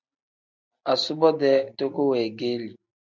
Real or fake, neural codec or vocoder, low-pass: real; none; 7.2 kHz